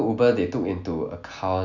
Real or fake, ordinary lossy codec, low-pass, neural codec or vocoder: real; none; 7.2 kHz; none